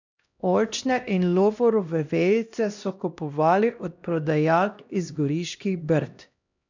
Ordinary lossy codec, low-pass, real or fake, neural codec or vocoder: none; 7.2 kHz; fake; codec, 16 kHz, 1 kbps, X-Codec, HuBERT features, trained on LibriSpeech